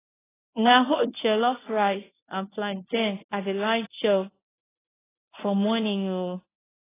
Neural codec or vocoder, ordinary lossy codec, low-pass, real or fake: codec, 24 kHz, 0.9 kbps, WavTokenizer, medium speech release version 1; AAC, 16 kbps; 3.6 kHz; fake